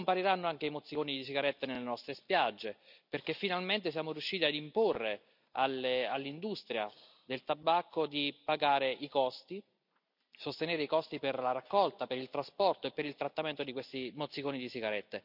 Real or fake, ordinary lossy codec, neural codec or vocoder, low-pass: real; none; none; 5.4 kHz